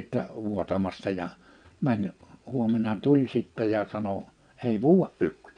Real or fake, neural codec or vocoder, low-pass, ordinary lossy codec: fake; vocoder, 22.05 kHz, 80 mel bands, WaveNeXt; 9.9 kHz; none